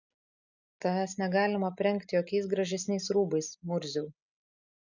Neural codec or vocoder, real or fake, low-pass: none; real; 7.2 kHz